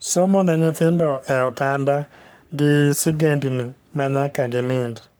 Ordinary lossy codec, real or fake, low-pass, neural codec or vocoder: none; fake; none; codec, 44.1 kHz, 3.4 kbps, Pupu-Codec